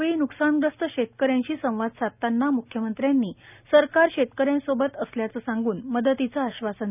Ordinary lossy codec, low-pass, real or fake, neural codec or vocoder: none; 3.6 kHz; real; none